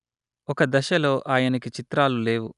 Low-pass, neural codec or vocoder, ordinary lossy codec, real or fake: 10.8 kHz; none; none; real